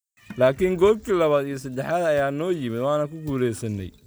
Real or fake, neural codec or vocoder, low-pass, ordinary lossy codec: real; none; none; none